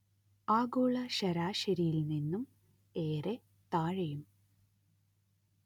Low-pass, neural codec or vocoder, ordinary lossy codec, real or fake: 19.8 kHz; none; none; real